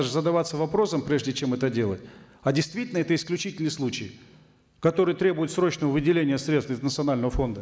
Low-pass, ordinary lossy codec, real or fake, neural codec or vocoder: none; none; real; none